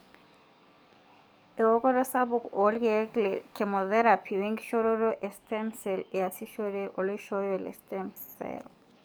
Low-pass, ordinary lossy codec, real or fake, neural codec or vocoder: none; none; fake; codec, 44.1 kHz, 7.8 kbps, DAC